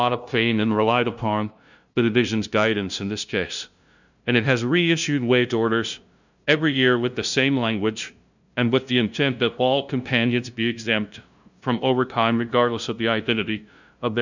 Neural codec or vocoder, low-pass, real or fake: codec, 16 kHz, 0.5 kbps, FunCodec, trained on LibriTTS, 25 frames a second; 7.2 kHz; fake